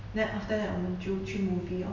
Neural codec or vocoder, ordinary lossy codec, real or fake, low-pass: none; none; real; 7.2 kHz